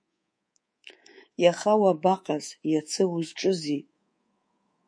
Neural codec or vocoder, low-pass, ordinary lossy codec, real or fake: codec, 24 kHz, 3.1 kbps, DualCodec; 9.9 kHz; MP3, 48 kbps; fake